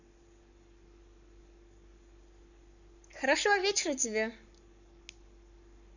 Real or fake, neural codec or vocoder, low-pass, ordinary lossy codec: fake; codec, 44.1 kHz, 7.8 kbps, Pupu-Codec; 7.2 kHz; none